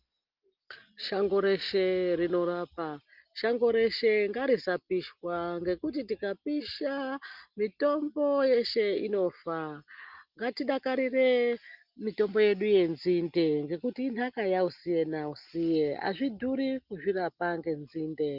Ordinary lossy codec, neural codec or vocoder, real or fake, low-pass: Opus, 32 kbps; none; real; 5.4 kHz